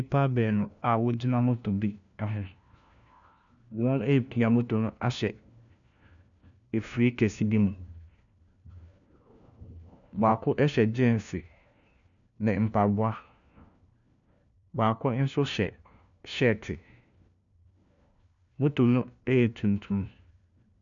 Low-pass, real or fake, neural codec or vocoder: 7.2 kHz; fake; codec, 16 kHz, 1 kbps, FunCodec, trained on LibriTTS, 50 frames a second